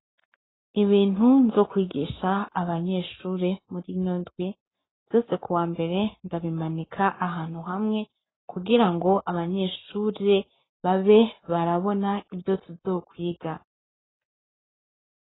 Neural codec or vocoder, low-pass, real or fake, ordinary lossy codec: codec, 44.1 kHz, 7.8 kbps, Pupu-Codec; 7.2 kHz; fake; AAC, 16 kbps